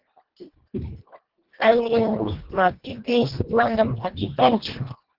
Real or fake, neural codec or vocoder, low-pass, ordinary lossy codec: fake; codec, 24 kHz, 1.5 kbps, HILCodec; 5.4 kHz; Opus, 16 kbps